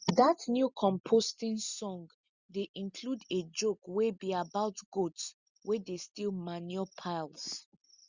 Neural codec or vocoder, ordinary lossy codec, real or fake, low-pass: none; Opus, 64 kbps; real; 7.2 kHz